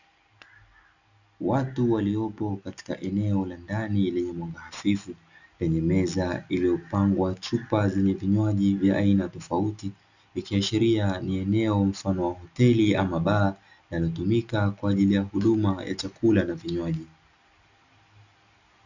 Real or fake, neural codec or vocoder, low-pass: real; none; 7.2 kHz